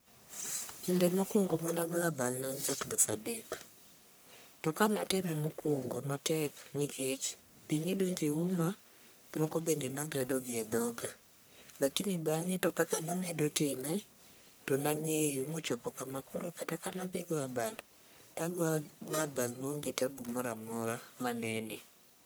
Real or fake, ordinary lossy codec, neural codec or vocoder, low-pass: fake; none; codec, 44.1 kHz, 1.7 kbps, Pupu-Codec; none